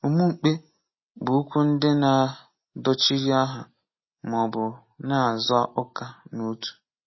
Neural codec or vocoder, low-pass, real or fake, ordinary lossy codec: none; 7.2 kHz; real; MP3, 24 kbps